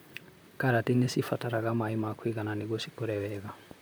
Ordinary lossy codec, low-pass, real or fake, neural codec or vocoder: none; none; fake; vocoder, 44.1 kHz, 128 mel bands, Pupu-Vocoder